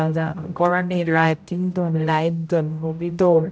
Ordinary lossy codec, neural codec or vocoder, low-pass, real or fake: none; codec, 16 kHz, 0.5 kbps, X-Codec, HuBERT features, trained on general audio; none; fake